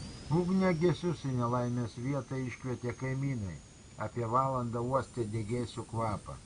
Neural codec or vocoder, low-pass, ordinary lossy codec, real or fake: none; 9.9 kHz; Opus, 64 kbps; real